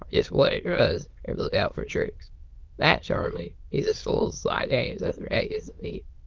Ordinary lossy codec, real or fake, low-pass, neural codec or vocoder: Opus, 32 kbps; fake; 7.2 kHz; autoencoder, 22.05 kHz, a latent of 192 numbers a frame, VITS, trained on many speakers